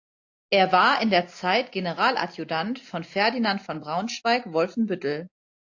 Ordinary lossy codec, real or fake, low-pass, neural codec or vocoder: AAC, 48 kbps; real; 7.2 kHz; none